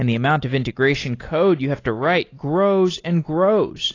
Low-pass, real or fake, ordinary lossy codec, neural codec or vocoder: 7.2 kHz; real; AAC, 32 kbps; none